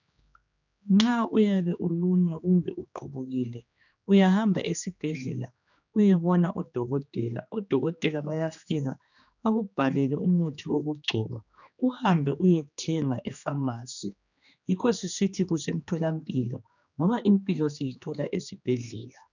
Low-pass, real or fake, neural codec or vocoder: 7.2 kHz; fake; codec, 16 kHz, 2 kbps, X-Codec, HuBERT features, trained on general audio